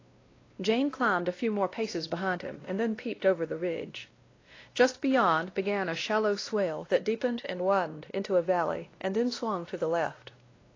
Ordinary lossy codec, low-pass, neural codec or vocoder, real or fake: AAC, 32 kbps; 7.2 kHz; codec, 16 kHz, 1 kbps, X-Codec, WavLM features, trained on Multilingual LibriSpeech; fake